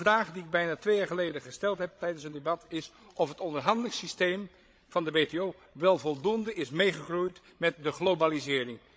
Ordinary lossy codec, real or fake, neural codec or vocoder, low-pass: none; fake; codec, 16 kHz, 16 kbps, FreqCodec, larger model; none